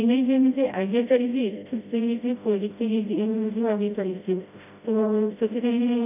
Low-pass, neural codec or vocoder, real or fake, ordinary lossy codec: 3.6 kHz; codec, 16 kHz, 0.5 kbps, FreqCodec, smaller model; fake; none